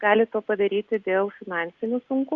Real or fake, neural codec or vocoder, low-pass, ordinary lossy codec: real; none; 7.2 kHz; Opus, 64 kbps